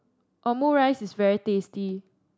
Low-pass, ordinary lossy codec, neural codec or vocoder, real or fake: none; none; none; real